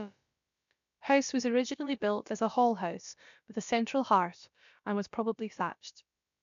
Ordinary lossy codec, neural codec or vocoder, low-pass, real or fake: MP3, 64 kbps; codec, 16 kHz, about 1 kbps, DyCAST, with the encoder's durations; 7.2 kHz; fake